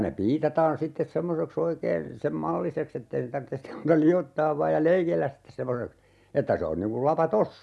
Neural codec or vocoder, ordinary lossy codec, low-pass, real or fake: none; none; none; real